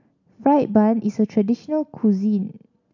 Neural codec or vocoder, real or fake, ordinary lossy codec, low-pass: none; real; none; 7.2 kHz